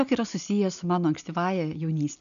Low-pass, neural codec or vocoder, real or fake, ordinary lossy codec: 7.2 kHz; none; real; MP3, 96 kbps